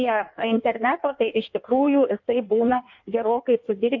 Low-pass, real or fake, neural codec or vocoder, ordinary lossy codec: 7.2 kHz; fake; codec, 16 kHz in and 24 kHz out, 1.1 kbps, FireRedTTS-2 codec; MP3, 48 kbps